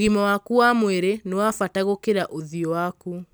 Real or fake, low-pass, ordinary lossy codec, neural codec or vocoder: real; none; none; none